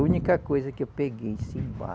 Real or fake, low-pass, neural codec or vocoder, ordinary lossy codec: real; none; none; none